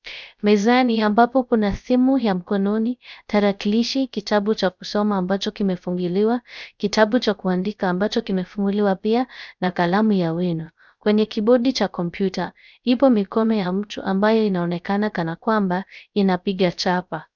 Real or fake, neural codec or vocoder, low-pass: fake; codec, 16 kHz, 0.3 kbps, FocalCodec; 7.2 kHz